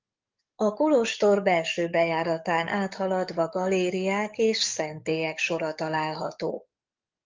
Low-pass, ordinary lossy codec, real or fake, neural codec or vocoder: 7.2 kHz; Opus, 24 kbps; fake; codec, 44.1 kHz, 7.8 kbps, DAC